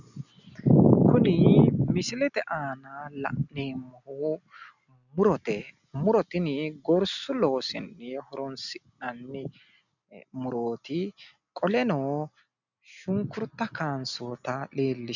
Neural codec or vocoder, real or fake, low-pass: none; real; 7.2 kHz